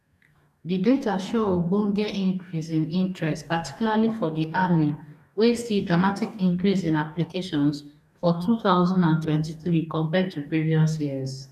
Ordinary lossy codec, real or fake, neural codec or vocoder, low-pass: none; fake; codec, 44.1 kHz, 2.6 kbps, DAC; 14.4 kHz